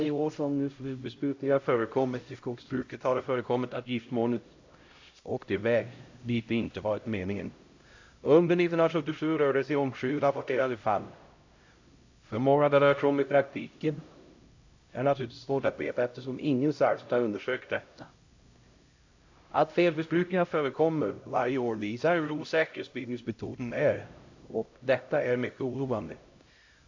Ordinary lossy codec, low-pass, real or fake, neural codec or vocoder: AAC, 48 kbps; 7.2 kHz; fake; codec, 16 kHz, 0.5 kbps, X-Codec, HuBERT features, trained on LibriSpeech